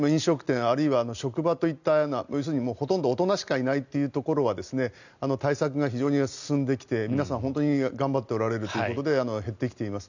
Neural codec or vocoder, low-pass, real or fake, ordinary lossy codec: none; 7.2 kHz; real; none